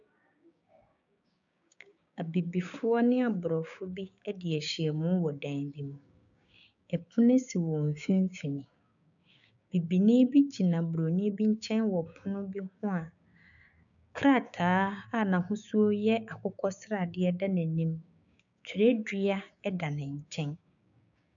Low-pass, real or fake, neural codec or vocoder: 7.2 kHz; fake; codec, 16 kHz, 6 kbps, DAC